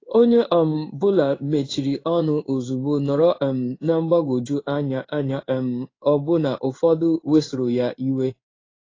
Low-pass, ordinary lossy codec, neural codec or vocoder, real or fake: 7.2 kHz; AAC, 32 kbps; codec, 16 kHz in and 24 kHz out, 1 kbps, XY-Tokenizer; fake